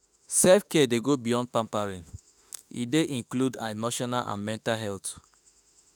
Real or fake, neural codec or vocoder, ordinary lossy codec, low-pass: fake; autoencoder, 48 kHz, 32 numbers a frame, DAC-VAE, trained on Japanese speech; none; none